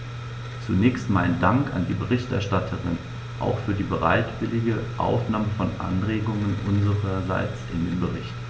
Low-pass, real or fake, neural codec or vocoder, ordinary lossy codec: none; real; none; none